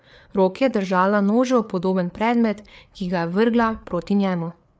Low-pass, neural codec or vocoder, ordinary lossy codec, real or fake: none; codec, 16 kHz, 4 kbps, FreqCodec, larger model; none; fake